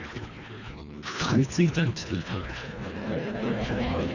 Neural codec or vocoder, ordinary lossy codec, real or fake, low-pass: codec, 24 kHz, 1.5 kbps, HILCodec; none; fake; 7.2 kHz